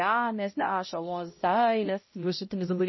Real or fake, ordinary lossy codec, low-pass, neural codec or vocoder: fake; MP3, 24 kbps; 7.2 kHz; codec, 16 kHz, 0.5 kbps, X-Codec, HuBERT features, trained on balanced general audio